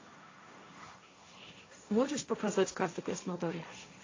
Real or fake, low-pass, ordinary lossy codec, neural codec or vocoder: fake; 7.2 kHz; AAC, 32 kbps; codec, 16 kHz, 1.1 kbps, Voila-Tokenizer